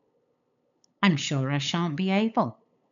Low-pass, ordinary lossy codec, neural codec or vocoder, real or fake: 7.2 kHz; none; codec, 16 kHz, 8 kbps, FunCodec, trained on LibriTTS, 25 frames a second; fake